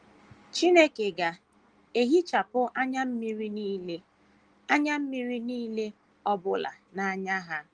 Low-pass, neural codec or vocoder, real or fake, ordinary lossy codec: 9.9 kHz; none; real; Opus, 24 kbps